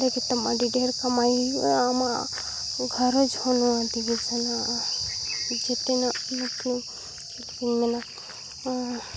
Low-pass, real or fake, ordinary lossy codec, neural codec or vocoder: none; real; none; none